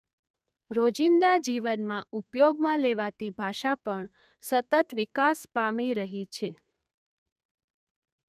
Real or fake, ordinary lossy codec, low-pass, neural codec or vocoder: fake; none; 14.4 kHz; codec, 32 kHz, 1.9 kbps, SNAC